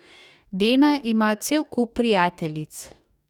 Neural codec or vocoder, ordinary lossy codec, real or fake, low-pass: codec, 44.1 kHz, 2.6 kbps, DAC; none; fake; 19.8 kHz